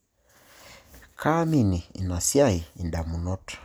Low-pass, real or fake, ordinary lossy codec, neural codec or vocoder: none; real; none; none